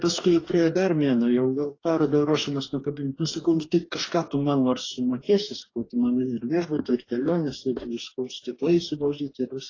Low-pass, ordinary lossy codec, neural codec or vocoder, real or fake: 7.2 kHz; AAC, 32 kbps; codec, 44.1 kHz, 2.6 kbps, DAC; fake